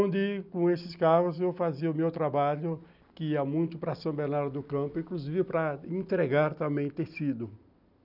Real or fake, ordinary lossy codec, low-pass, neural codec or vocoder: real; none; 5.4 kHz; none